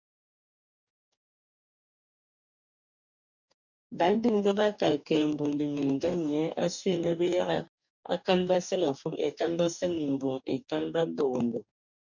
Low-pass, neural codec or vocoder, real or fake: 7.2 kHz; codec, 44.1 kHz, 2.6 kbps, DAC; fake